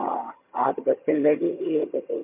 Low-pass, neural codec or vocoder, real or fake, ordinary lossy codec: 3.6 kHz; vocoder, 22.05 kHz, 80 mel bands, HiFi-GAN; fake; MP3, 32 kbps